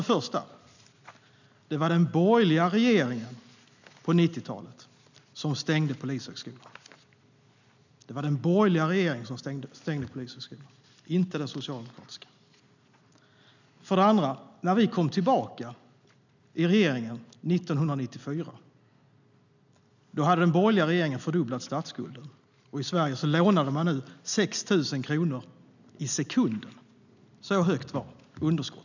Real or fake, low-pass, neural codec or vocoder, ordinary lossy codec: real; 7.2 kHz; none; none